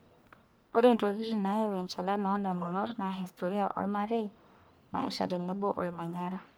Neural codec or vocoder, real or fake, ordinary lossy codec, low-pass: codec, 44.1 kHz, 1.7 kbps, Pupu-Codec; fake; none; none